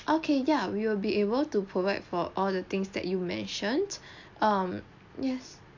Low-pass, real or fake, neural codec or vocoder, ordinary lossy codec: 7.2 kHz; real; none; MP3, 48 kbps